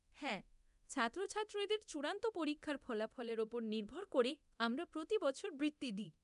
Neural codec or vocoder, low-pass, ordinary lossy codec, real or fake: codec, 24 kHz, 0.9 kbps, DualCodec; 10.8 kHz; none; fake